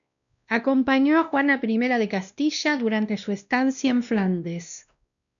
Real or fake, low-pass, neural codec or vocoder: fake; 7.2 kHz; codec, 16 kHz, 1 kbps, X-Codec, WavLM features, trained on Multilingual LibriSpeech